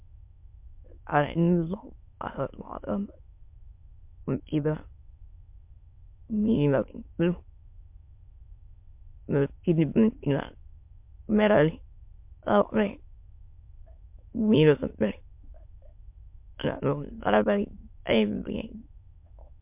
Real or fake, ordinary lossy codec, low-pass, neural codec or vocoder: fake; MP3, 32 kbps; 3.6 kHz; autoencoder, 22.05 kHz, a latent of 192 numbers a frame, VITS, trained on many speakers